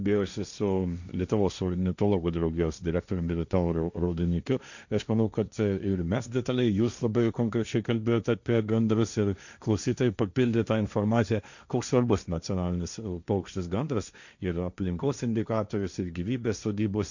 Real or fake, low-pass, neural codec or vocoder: fake; 7.2 kHz; codec, 16 kHz, 1.1 kbps, Voila-Tokenizer